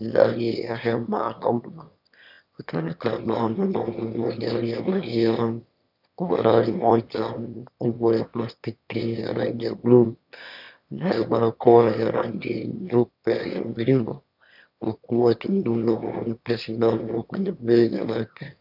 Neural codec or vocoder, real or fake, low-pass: autoencoder, 22.05 kHz, a latent of 192 numbers a frame, VITS, trained on one speaker; fake; 5.4 kHz